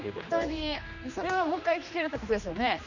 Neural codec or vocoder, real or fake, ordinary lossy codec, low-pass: codec, 16 kHz, 2 kbps, X-Codec, HuBERT features, trained on general audio; fake; none; 7.2 kHz